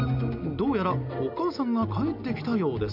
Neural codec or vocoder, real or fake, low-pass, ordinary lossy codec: none; real; 5.4 kHz; none